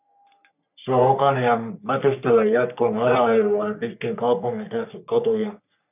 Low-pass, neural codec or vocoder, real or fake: 3.6 kHz; codec, 44.1 kHz, 3.4 kbps, Pupu-Codec; fake